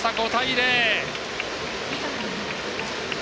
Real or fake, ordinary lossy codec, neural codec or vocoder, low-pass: real; none; none; none